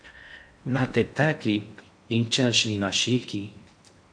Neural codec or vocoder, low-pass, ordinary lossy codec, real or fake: codec, 16 kHz in and 24 kHz out, 0.6 kbps, FocalCodec, streaming, 2048 codes; 9.9 kHz; AAC, 64 kbps; fake